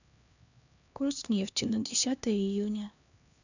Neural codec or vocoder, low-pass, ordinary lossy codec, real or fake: codec, 16 kHz, 2 kbps, X-Codec, HuBERT features, trained on LibriSpeech; 7.2 kHz; none; fake